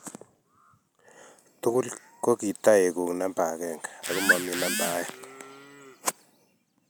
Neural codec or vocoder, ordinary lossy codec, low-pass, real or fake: none; none; none; real